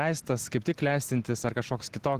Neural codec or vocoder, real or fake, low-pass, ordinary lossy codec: none; real; 14.4 kHz; Opus, 16 kbps